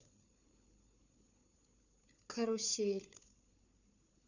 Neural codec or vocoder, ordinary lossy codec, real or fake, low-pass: codec, 16 kHz, 4 kbps, FunCodec, trained on Chinese and English, 50 frames a second; none; fake; 7.2 kHz